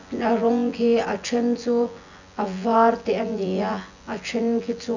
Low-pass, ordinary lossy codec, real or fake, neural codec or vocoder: 7.2 kHz; none; fake; vocoder, 24 kHz, 100 mel bands, Vocos